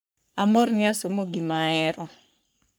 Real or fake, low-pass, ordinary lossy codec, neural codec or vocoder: fake; none; none; codec, 44.1 kHz, 3.4 kbps, Pupu-Codec